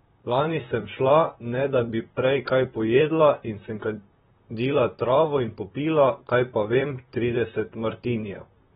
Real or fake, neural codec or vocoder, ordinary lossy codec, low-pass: fake; vocoder, 44.1 kHz, 128 mel bands, Pupu-Vocoder; AAC, 16 kbps; 19.8 kHz